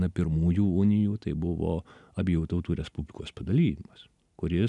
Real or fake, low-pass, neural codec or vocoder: real; 10.8 kHz; none